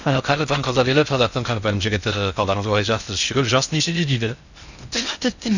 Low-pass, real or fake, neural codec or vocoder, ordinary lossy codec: 7.2 kHz; fake; codec, 16 kHz in and 24 kHz out, 0.6 kbps, FocalCodec, streaming, 4096 codes; none